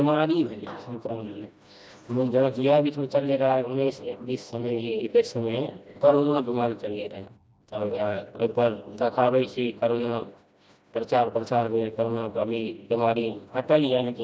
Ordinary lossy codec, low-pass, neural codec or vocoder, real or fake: none; none; codec, 16 kHz, 1 kbps, FreqCodec, smaller model; fake